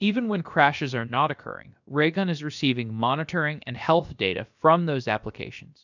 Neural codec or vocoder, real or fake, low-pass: codec, 16 kHz, about 1 kbps, DyCAST, with the encoder's durations; fake; 7.2 kHz